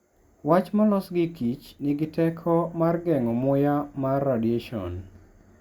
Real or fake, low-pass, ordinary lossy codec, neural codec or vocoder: real; 19.8 kHz; Opus, 64 kbps; none